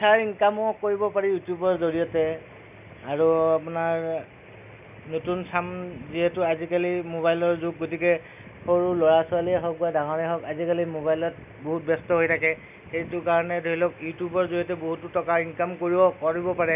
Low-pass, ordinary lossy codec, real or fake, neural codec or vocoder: 3.6 kHz; none; real; none